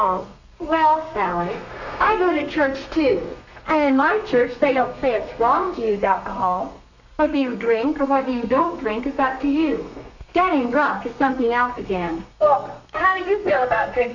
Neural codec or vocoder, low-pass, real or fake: codec, 32 kHz, 1.9 kbps, SNAC; 7.2 kHz; fake